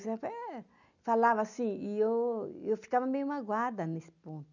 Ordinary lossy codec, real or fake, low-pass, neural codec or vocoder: none; real; 7.2 kHz; none